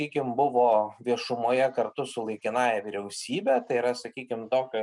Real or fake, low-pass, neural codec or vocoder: real; 10.8 kHz; none